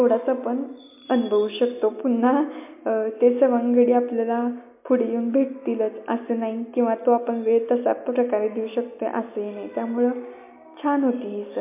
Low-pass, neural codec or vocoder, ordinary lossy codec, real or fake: 3.6 kHz; none; MP3, 24 kbps; real